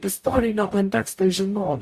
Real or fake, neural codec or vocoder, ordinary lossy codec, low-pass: fake; codec, 44.1 kHz, 0.9 kbps, DAC; Opus, 64 kbps; 14.4 kHz